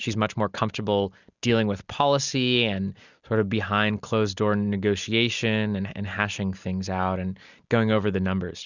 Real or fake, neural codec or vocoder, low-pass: real; none; 7.2 kHz